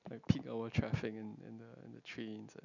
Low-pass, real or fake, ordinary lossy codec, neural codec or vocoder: 7.2 kHz; real; none; none